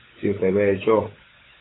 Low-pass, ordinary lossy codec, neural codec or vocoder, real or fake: 7.2 kHz; AAC, 16 kbps; codec, 16 kHz, 16 kbps, FunCodec, trained on LibriTTS, 50 frames a second; fake